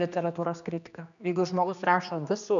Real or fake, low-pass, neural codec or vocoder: fake; 7.2 kHz; codec, 16 kHz, 2 kbps, X-Codec, HuBERT features, trained on general audio